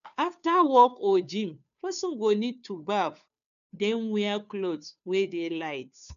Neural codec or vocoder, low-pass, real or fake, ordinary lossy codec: codec, 16 kHz, 2 kbps, FunCodec, trained on Chinese and English, 25 frames a second; 7.2 kHz; fake; none